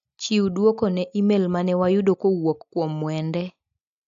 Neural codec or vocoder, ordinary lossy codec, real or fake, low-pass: none; AAC, 64 kbps; real; 7.2 kHz